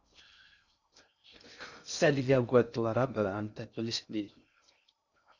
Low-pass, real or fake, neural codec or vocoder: 7.2 kHz; fake; codec, 16 kHz in and 24 kHz out, 0.6 kbps, FocalCodec, streaming, 2048 codes